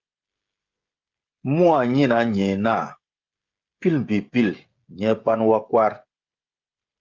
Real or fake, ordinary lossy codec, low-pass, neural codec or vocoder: fake; Opus, 32 kbps; 7.2 kHz; codec, 16 kHz, 16 kbps, FreqCodec, smaller model